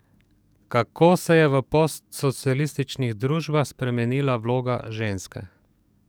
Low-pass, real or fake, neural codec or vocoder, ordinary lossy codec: none; fake; codec, 44.1 kHz, 7.8 kbps, DAC; none